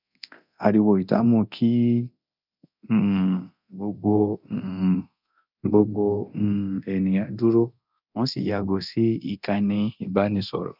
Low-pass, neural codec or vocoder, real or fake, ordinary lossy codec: 5.4 kHz; codec, 24 kHz, 0.9 kbps, DualCodec; fake; none